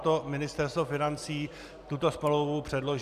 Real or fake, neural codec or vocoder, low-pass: real; none; 14.4 kHz